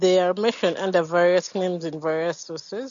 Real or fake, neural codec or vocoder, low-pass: real; none; 7.2 kHz